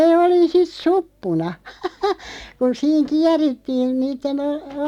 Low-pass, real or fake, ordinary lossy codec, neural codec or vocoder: 19.8 kHz; real; none; none